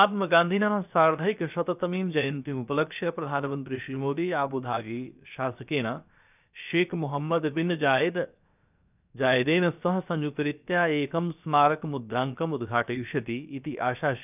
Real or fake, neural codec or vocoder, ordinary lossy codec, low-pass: fake; codec, 16 kHz, 0.7 kbps, FocalCodec; none; 3.6 kHz